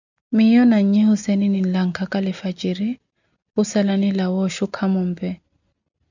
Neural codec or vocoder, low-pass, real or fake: none; 7.2 kHz; real